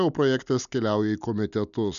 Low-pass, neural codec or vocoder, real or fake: 7.2 kHz; none; real